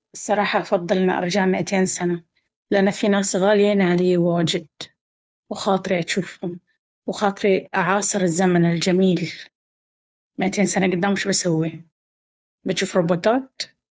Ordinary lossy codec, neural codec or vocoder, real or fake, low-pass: none; codec, 16 kHz, 2 kbps, FunCodec, trained on Chinese and English, 25 frames a second; fake; none